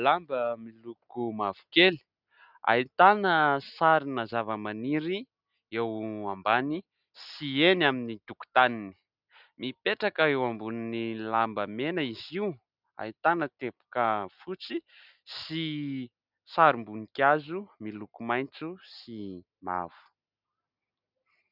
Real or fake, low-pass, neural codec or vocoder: real; 5.4 kHz; none